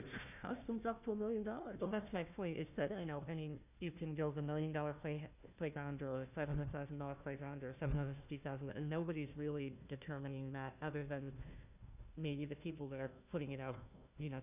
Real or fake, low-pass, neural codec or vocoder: fake; 3.6 kHz; codec, 16 kHz, 1 kbps, FunCodec, trained on Chinese and English, 50 frames a second